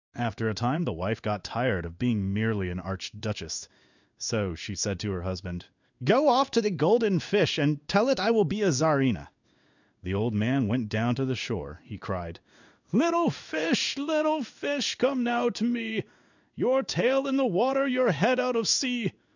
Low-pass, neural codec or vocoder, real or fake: 7.2 kHz; codec, 16 kHz in and 24 kHz out, 1 kbps, XY-Tokenizer; fake